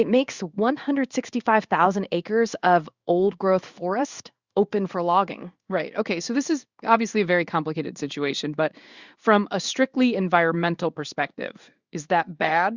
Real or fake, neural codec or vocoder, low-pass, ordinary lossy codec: fake; codec, 16 kHz in and 24 kHz out, 1 kbps, XY-Tokenizer; 7.2 kHz; Opus, 64 kbps